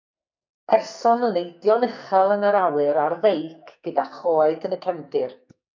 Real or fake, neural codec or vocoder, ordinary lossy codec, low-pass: fake; codec, 44.1 kHz, 2.6 kbps, SNAC; MP3, 64 kbps; 7.2 kHz